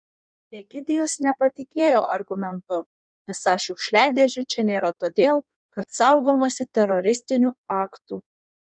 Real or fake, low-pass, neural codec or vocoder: fake; 9.9 kHz; codec, 16 kHz in and 24 kHz out, 1.1 kbps, FireRedTTS-2 codec